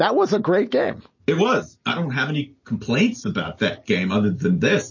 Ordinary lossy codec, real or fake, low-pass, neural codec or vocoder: MP3, 32 kbps; real; 7.2 kHz; none